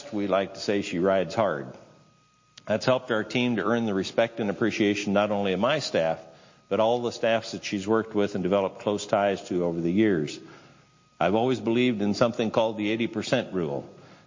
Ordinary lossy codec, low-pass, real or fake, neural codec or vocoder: MP3, 32 kbps; 7.2 kHz; real; none